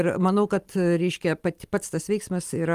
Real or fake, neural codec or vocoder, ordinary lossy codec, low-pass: real; none; Opus, 32 kbps; 14.4 kHz